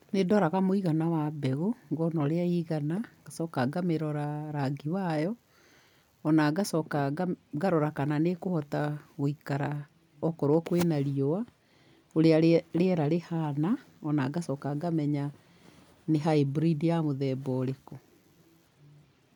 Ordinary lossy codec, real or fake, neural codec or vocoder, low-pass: none; real; none; 19.8 kHz